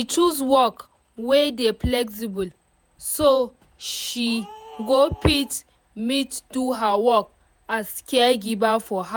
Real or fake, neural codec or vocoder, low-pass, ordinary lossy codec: fake; vocoder, 48 kHz, 128 mel bands, Vocos; none; none